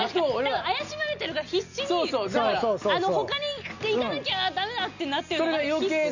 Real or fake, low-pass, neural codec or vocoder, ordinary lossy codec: real; 7.2 kHz; none; none